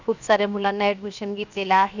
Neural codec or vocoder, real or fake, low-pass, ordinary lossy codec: codec, 16 kHz, 0.7 kbps, FocalCodec; fake; 7.2 kHz; none